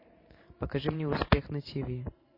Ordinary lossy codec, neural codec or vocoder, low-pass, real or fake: MP3, 24 kbps; vocoder, 44.1 kHz, 128 mel bands every 512 samples, BigVGAN v2; 5.4 kHz; fake